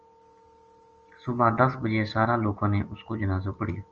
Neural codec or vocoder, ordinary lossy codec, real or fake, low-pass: none; Opus, 24 kbps; real; 7.2 kHz